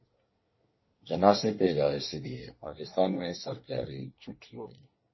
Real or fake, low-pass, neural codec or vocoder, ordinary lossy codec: fake; 7.2 kHz; codec, 24 kHz, 1 kbps, SNAC; MP3, 24 kbps